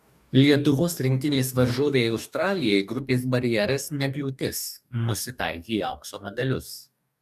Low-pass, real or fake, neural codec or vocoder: 14.4 kHz; fake; codec, 44.1 kHz, 2.6 kbps, DAC